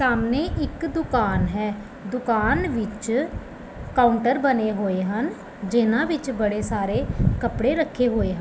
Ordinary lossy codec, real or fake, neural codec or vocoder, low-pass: none; real; none; none